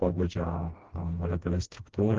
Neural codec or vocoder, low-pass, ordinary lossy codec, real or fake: codec, 16 kHz, 1 kbps, FreqCodec, smaller model; 7.2 kHz; Opus, 16 kbps; fake